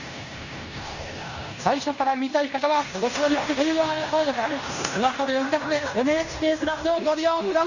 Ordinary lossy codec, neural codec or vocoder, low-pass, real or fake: none; codec, 16 kHz in and 24 kHz out, 0.9 kbps, LongCat-Audio-Codec, fine tuned four codebook decoder; 7.2 kHz; fake